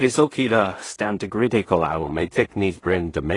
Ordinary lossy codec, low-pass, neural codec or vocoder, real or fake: AAC, 32 kbps; 10.8 kHz; codec, 16 kHz in and 24 kHz out, 0.4 kbps, LongCat-Audio-Codec, two codebook decoder; fake